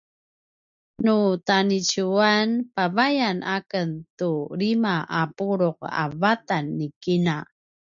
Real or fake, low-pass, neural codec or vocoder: real; 7.2 kHz; none